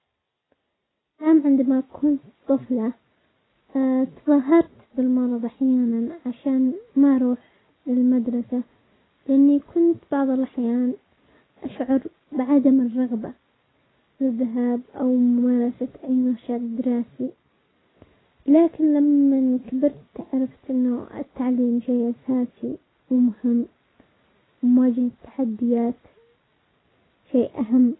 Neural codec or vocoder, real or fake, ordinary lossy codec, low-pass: none; real; AAC, 16 kbps; 7.2 kHz